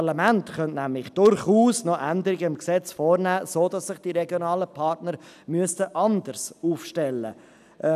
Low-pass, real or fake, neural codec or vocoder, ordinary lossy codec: 14.4 kHz; real; none; none